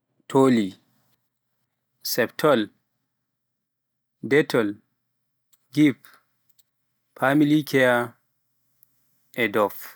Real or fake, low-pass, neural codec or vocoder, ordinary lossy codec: real; none; none; none